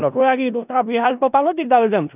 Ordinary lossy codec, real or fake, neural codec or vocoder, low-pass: none; fake; codec, 16 kHz in and 24 kHz out, 0.4 kbps, LongCat-Audio-Codec, four codebook decoder; 3.6 kHz